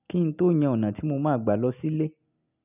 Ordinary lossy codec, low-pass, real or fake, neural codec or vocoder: none; 3.6 kHz; real; none